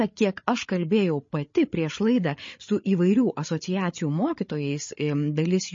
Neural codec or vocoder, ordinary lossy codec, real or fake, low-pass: codec, 16 kHz, 8 kbps, FreqCodec, larger model; MP3, 32 kbps; fake; 7.2 kHz